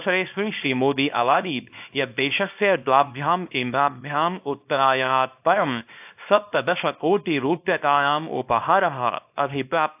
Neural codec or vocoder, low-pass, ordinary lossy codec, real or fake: codec, 24 kHz, 0.9 kbps, WavTokenizer, small release; 3.6 kHz; AAC, 32 kbps; fake